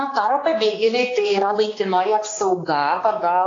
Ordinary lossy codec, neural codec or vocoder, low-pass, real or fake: AAC, 32 kbps; codec, 16 kHz, 2 kbps, X-Codec, HuBERT features, trained on balanced general audio; 7.2 kHz; fake